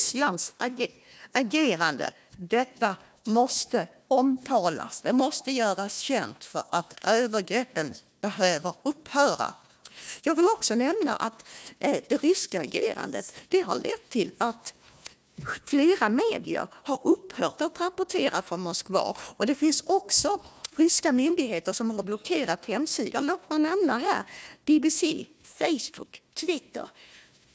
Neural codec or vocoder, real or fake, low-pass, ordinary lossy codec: codec, 16 kHz, 1 kbps, FunCodec, trained on Chinese and English, 50 frames a second; fake; none; none